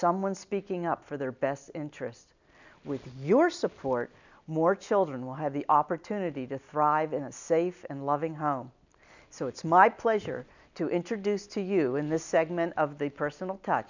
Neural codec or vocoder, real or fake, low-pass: none; real; 7.2 kHz